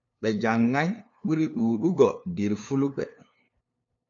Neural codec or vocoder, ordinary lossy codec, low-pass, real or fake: codec, 16 kHz, 2 kbps, FunCodec, trained on LibriTTS, 25 frames a second; AAC, 64 kbps; 7.2 kHz; fake